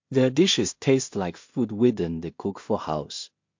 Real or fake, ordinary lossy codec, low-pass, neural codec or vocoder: fake; MP3, 48 kbps; 7.2 kHz; codec, 16 kHz in and 24 kHz out, 0.4 kbps, LongCat-Audio-Codec, two codebook decoder